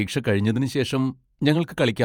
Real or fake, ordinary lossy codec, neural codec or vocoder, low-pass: fake; none; vocoder, 48 kHz, 128 mel bands, Vocos; 19.8 kHz